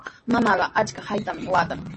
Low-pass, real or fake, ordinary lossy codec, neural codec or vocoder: 10.8 kHz; real; MP3, 32 kbps; none